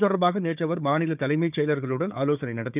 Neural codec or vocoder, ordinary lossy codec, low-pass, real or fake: codec, 16 kHz, 4 kbps, FunCodec, trained on Chinese and English, 50 frames a second; none; 3.6 kHz; fake